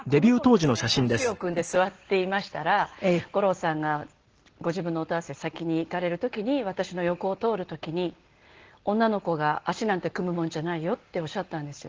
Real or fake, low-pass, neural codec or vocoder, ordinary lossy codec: real; 7.2 kHz; none; Opus, 16 kbps